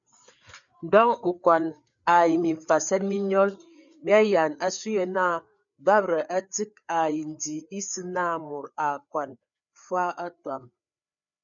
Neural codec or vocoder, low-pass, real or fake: codec, 16 kHz, 4 kbps, FreqCodec, larger model; 7.2 kHz; fake